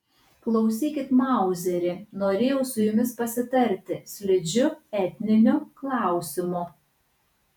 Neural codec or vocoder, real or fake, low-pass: vocoder, 48 kHz, 128 mel bands, Vocos; fake; 19.8 kHz